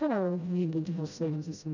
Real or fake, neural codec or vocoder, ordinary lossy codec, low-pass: fake; codec, 16 kHz, 0.5 kbps, FreqCodec, smaller model; none; 7.2 kHz